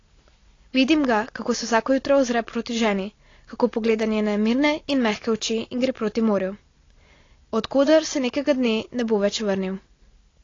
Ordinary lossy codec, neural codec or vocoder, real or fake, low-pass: AAC, 32 kbps; none; real; 7.2 kHz